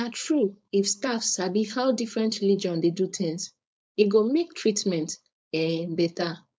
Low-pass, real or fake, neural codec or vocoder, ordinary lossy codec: none; fake; codec, 16 kHz, 4.8 kbps, FACodec; none